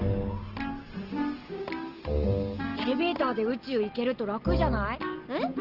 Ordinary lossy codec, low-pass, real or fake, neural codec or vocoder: Opus, 16 kbps; 5.4 kHz; real; none